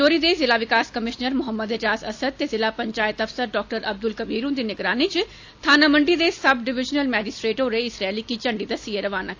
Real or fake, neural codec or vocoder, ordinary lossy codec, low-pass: real; none; AAC, 48 kbps; 7.2 kHz